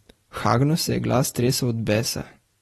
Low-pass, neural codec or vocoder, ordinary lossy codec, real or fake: 19.8 kHz; none; AAC, 32 kbps; real